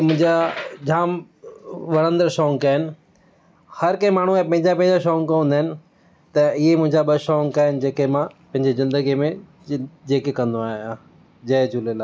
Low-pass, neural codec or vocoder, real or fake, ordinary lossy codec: none; none; real; none